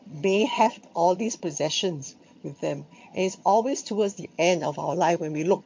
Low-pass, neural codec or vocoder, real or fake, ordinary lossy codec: 7.2 kHz; vocoder, 22.05 kHz, 80 mel bands, HiFi-GAN; fake; MP3, 48 kbps